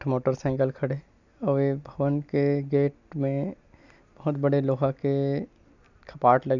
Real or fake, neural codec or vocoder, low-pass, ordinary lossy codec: real; none; 7.2 kHz; none